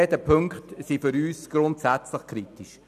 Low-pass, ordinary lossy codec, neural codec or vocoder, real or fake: 14.4 kHz; none; none; real